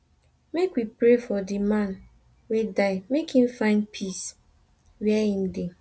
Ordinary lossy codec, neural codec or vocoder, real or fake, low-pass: none; none; real; none